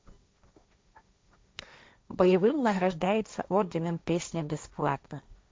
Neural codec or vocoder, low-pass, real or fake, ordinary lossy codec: codec, 16 kHz, 1.1 kbps, Voila-Tokenizer; none; fake; none